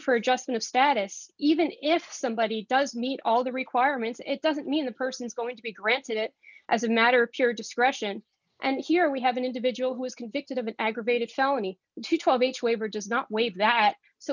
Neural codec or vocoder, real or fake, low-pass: none; real; 7.2 kHz